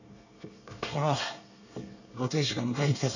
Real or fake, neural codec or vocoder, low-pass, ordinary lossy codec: fake; codec, 24 kHz, 1 kbps, SNAC; 7.2 kHz; none